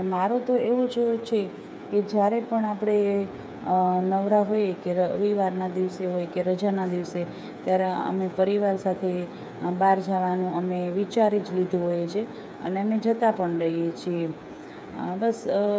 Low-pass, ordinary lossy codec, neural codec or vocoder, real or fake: none; none; codec, 16 kHz, 8 kbps, FreqCodec, smaller model; fake